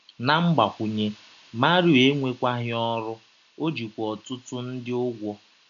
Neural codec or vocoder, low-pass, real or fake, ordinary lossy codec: none; 7.2 kHz; real; none